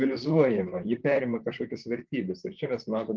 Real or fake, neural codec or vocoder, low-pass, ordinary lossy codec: fake; vocoder, 44.1 kHz, 128 mel bands, Pupu-Vocoder; 7.2 kHz; Opus, 32 kbps